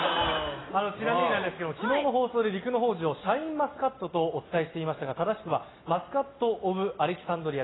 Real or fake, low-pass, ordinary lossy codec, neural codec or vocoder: real; 7.2 kHz; AAC, 16 kbps; none